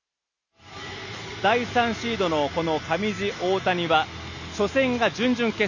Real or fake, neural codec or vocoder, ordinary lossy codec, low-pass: real; none; none; 7.2 kHz